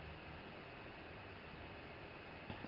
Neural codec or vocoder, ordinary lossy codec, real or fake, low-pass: none; Opus, 32 kbps; real; 5.4 kHz